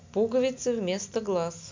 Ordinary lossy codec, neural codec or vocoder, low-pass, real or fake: MP3, 64 kbps; none; 7.2 kHz; real